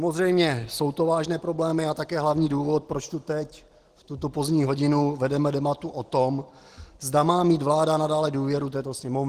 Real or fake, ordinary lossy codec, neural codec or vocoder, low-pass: real; Opus, 16 kbps; none; 14.4 kHz